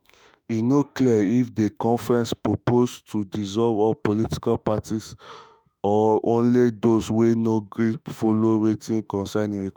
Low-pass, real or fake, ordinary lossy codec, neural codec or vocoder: none; fake; none; autoencoder, 48 kHz, 32 numbers a frame, DAC-VAE, trained on Japanese speech